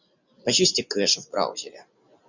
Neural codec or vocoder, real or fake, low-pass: none; real; 7.2 kHz